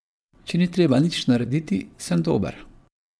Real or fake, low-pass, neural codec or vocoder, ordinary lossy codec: fake; none; vocoder, 22.05 kHz, 80 mel bands, WaveNeXt; none